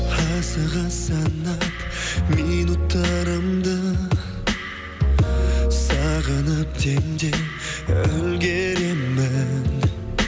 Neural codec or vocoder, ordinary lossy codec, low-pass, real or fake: none; none; none; real